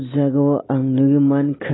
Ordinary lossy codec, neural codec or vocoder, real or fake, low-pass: AAC, 16 kbps; none; real; 7.2 kHz